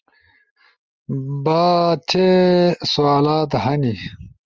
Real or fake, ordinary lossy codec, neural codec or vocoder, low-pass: real; Opus, 24 kbps; none; 7.2 kHz